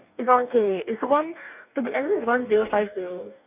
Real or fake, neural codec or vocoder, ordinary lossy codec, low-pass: fake; codec, 44.1 kHz, 2.6 kbps, DAC; none; 3.6 kHz